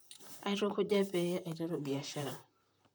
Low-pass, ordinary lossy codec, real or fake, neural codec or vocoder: none; none; fake; vocoder, 44.1 kHz, 128 mel bands, Pupu-Vocoder